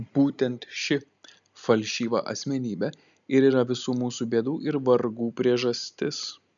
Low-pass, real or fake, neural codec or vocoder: 7.2 kHz; real; none